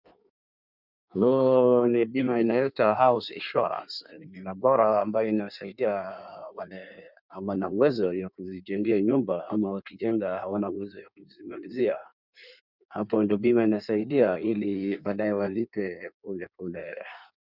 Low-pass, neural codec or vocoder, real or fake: 5.4 kHz; codec, 16 kHz in and 24 kHz out, 1.1 kbps, FireRedTTS-2 codec; fake